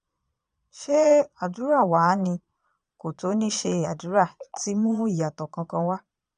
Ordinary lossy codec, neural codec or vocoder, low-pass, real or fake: none; vocoder, 22.05 kHz, 80 mel bands, Vocos; 9.9 kHz; fake